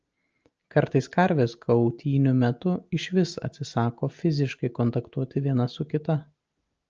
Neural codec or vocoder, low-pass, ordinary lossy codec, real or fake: none; 7.2 kHz; Opus, 24 kbps; real